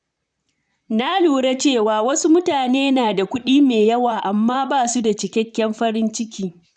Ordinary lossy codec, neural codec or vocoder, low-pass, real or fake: none; vocoder, 44.1 kHz, 128 mel bands, Pupu-Vocoder; 9.9 kHz; fake